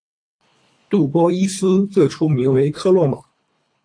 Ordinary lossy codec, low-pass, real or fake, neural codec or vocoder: AAC, 48 kbps; 9.9 kHz; fake; codec, 24 kHz, 3 kbps, HILCodec